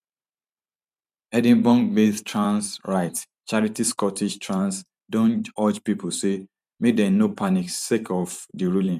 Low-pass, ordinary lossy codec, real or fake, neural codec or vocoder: 14.4 kHz; none; fake; vocoder, 44.1 kHz, 128 mel bands every 512 samples, BigVGAN v2